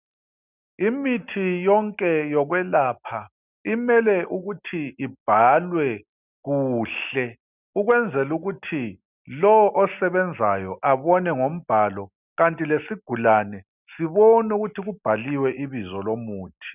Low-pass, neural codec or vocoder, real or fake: 3.6 kHz; none; real